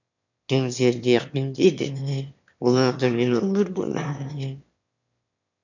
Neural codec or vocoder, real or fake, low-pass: autoencoder, 22.05 kHz, a latent of 192 numbers a frame, VITS, trained on one speaker; fake; 7.2 kHz